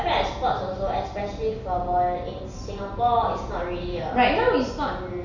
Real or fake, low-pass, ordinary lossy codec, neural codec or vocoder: real; 7.2 kHz; none; none